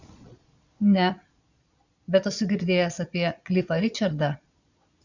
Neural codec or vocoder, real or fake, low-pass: vocoder, 22.05 kHz, 80 mel bands, Vocos; fake; 7.2 kHz